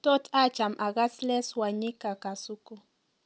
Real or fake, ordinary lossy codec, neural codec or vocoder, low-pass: real; none; none; none